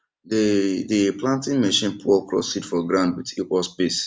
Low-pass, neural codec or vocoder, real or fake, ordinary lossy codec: none; none; real; none